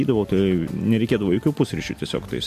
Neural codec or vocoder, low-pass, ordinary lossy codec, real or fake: none; 14.4 kHz; MP3, 64 kbps; real